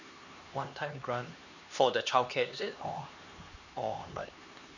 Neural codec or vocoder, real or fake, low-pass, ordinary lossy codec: codec, 16 kHz, 2 kbps, X-Codec, HuBERT features, trained on LibriSpeech; fake; 7.2 kHz; none